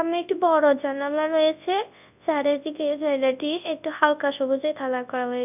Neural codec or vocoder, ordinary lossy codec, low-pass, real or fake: codec, 24 kHz, 0.9 kbps, WavTokenizer, large speech release; none; 3.6 kHz; fake